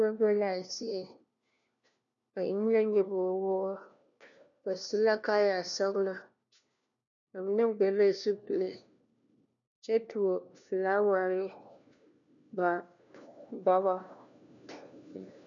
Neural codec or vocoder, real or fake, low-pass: codec, 16 kHz, 1 kbps, FunCodec, trained on LibriTTS, 50 frames a second; fake; 7.2 kHz